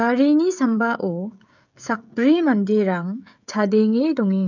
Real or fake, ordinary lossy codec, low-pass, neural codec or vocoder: fake; none; 7.2 kHz; codec, 16 kHz, 16 kbps, FreqCodec, smaller model